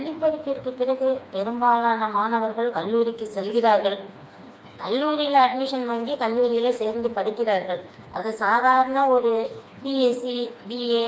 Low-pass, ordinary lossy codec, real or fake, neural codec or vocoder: none; none; fake; codec, 16 kHz, 2 kbps, FreqCodec, smaller model